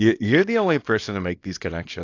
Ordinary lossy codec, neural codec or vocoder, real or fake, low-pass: AAC, 48 kbps; codec, 24 kHz, 0.9 kbps, WavTokenizer, small release; fake; 7.2 kHz